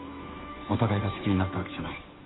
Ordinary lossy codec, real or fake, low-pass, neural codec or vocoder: AAC, 16 kbps; fake; 7.2 kHz; codec, 16 kHz, 2 kbps, FunCodec, trained on Chinese and English, 25 frames a second